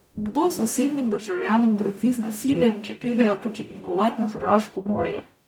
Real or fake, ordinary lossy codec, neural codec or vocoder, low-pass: fake; none; codec, 44.1 kHz, 0.9 kbps, DAC; 19.8 kHz